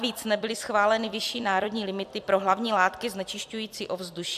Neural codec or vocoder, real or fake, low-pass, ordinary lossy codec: vocoder, 44.1 kHz, 128 mel bands every 256 samples, BigVGAN v2; fake; 14.4 kHz; AAC, 96 kbps